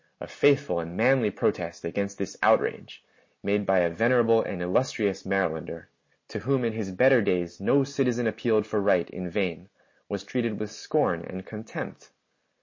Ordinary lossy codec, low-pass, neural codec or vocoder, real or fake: MP3, 32 kbps; 7.2 kHz; none; real